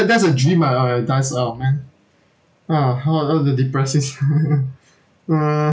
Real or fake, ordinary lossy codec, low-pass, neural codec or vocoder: real; none; none; none